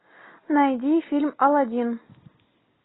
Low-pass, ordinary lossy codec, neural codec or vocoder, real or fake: 7.2 kHz; AAC, 16 kbps; none; real